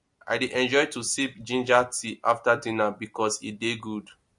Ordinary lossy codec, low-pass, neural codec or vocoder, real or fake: MP3, 48 kbps; 10.8 kHz; none; real